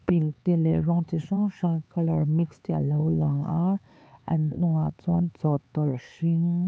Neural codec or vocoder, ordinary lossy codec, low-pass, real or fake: codec, 16 kHz, 4 kbps, X-Codec, HuBERT features, trained on LibriSpeech; none; none; fake